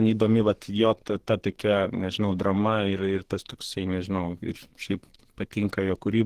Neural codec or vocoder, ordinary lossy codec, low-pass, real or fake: codec, 44.1 kHz, 2.6 kbps, SNAC; Opus, 16 kbps; 14.4 kHz; fake